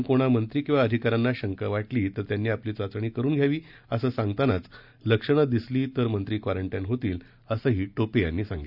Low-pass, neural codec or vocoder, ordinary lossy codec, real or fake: 5.4 kHz; none; none; real